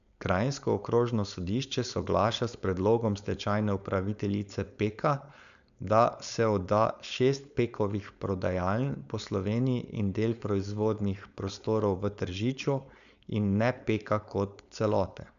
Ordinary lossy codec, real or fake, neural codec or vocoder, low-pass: none; fake; codec, 16 kHz, 4.8 kbps, FACodec; 7.2 kHz